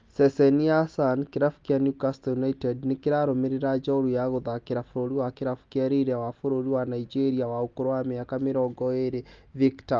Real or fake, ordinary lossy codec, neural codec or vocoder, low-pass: real; Opus, 32 kbps; none; 7.2 kHz